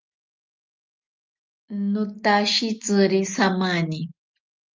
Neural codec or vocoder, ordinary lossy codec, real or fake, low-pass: none; Opus, 24 kbps; real; 7.2 kHz